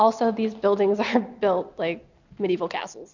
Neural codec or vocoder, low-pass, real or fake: none; 7.2 kHz; real